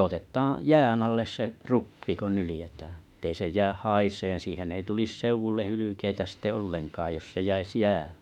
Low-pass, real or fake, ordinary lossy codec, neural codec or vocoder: 19.8 kHz; fake; none; autoencoder, 48 kHz, 32 numbers a frame, DAC-VAE, trained on Japanese speech